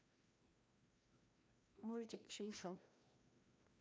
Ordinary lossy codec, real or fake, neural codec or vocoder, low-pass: none; fake; codec, 16 kHz, 1 kbps, FreqCodec, larger model; none